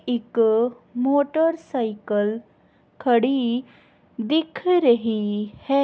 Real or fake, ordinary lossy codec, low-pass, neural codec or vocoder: real; none; none; none